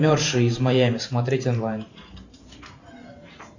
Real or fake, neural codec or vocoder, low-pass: fake; vocoder, 44.1 kHz, 128 mel bands every 512 samples, BigVGAN v2; 7.2 kHz